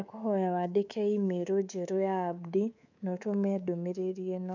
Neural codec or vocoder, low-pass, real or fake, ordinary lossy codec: codec, 24 kHz, 3.1 kbps, DualCodec; 7.2 kHz; fake; none